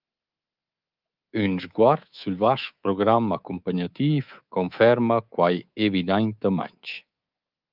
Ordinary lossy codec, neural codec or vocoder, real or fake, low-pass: Opus, 24 kbps; codec, 24 kHz, 3.1 kbps, DualCodec; fake; 5.4 kHz